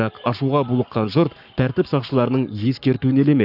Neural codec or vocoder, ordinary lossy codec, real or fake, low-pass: codec, 44.1 kHz, 7.8 kbps, Pupu-Codec; none; fake; 5.4 kHz